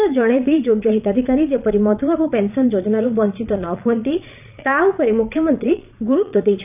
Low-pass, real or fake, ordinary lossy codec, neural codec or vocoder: 3.6 kHz; fake; none; vocoder, 22.05 kHz, 80 mel bands, Vocos